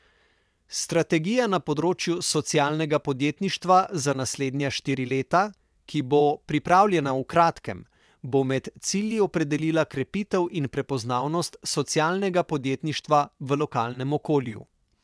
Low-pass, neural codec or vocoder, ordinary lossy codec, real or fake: none; vocoder, 22.05 kHz, 80 mel bands, WaveNeXt; none; fake